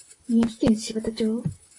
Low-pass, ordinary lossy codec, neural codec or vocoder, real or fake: 10.8 kHz; AAC, 48 kbps; vocoder, 44.1 kHz, 128 mel bands, Pupu-Vocoder; fake